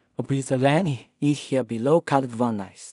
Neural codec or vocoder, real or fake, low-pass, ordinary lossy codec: codec, 16 kHz in and 24 kHz out, 0.4 kbps, LongCat-Audio-Codec, two codebook decoder; fake; 10.8 kHz; none